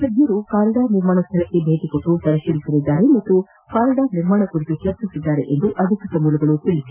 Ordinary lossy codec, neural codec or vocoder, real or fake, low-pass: none; none; real; 3.6 kHz